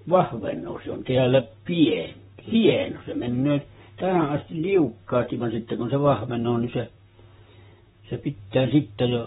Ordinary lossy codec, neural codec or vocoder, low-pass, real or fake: AAC, 16 kbps; vocoder, 44.1 kHz, 128 mel bands, Pupu-Vocoder; 19.8 kHz; fake